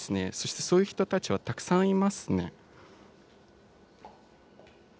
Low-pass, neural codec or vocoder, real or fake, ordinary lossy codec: none; none; real; none